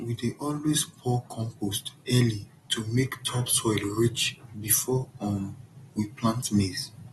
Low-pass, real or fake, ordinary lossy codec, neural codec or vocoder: 19.8 kHz; real; AAC, 32 kbps; none